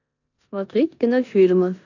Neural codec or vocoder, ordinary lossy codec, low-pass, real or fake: codec, 16 kHz in and 24 kHz out, 0.9 kbps, LongCat-Audio-Codec, four codebook decoder; none; 7.2 kHz; fake